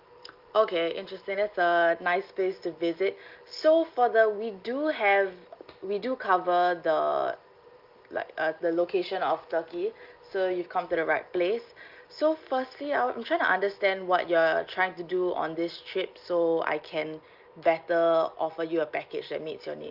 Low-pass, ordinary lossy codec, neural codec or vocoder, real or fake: 5.4 kHz; Opus, 24 kbps; none; real